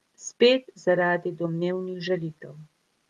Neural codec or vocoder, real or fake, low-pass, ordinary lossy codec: none; real; 19.8 kHz; Opus, 32 kbps